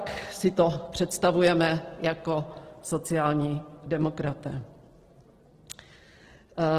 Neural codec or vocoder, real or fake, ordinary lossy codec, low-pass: none; real; Opus, 16 kbps; 14.4 kHz